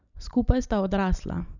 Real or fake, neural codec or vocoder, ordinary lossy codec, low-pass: real; none; none; 7.2 kHz